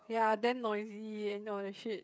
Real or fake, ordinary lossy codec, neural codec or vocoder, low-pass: fake; none; codec, 16 kHz, 8 kbps, FreqCodec, smaller model; none